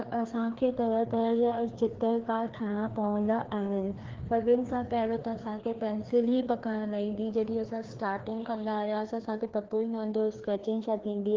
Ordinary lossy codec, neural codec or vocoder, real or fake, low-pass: Opus, 16 kbps; codec, 16 kHz, 2 kbps, FreqCodec, larger model; fake; 7.2 kHz